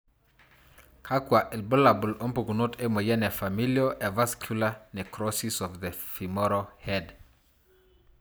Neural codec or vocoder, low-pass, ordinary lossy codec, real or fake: none; none; none; real